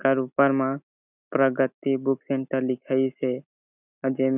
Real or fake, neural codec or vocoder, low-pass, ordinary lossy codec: real; none; 3.6 kHz; none